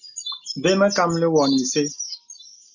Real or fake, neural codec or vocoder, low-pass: real; none; 7.2 kHz